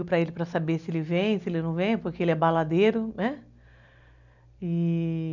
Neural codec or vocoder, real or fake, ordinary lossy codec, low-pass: none; real; none; 7.2 kHz